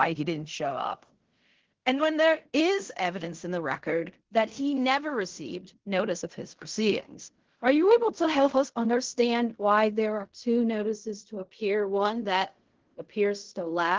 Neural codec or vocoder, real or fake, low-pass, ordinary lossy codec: codec, 16 kHz in and 24 kHz out, 0.4 kbps, LongCat-Audio-Codec, fine tuned four codebook decoder; fake; 7.2 kHz; Opus, 16 kbps